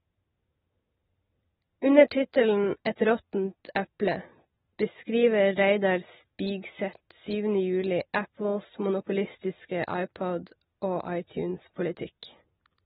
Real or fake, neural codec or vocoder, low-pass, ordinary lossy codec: real; none; 7.2 kHz; AAC, 16 kbps